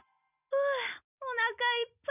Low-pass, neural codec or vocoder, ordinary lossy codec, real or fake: 3.6 kHz; none; none; real